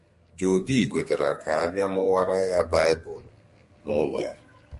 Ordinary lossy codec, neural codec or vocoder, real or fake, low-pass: MP3, 48 kbps; codec, 32 kHz, 1.9 kbps, SNAC; fake; 14.4 kHz